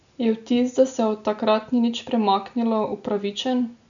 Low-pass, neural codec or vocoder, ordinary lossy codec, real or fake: 7.2 kHz; none; none; real